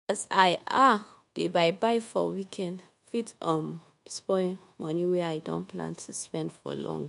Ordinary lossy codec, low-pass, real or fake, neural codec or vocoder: MP3, 64 kbps; 10.8 kHz; fake; codec, 24 kHz, 1.2 kbps, DualCodec